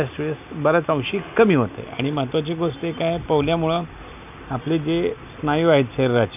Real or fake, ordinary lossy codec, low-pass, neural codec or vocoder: real; none; 3.6 kHz; none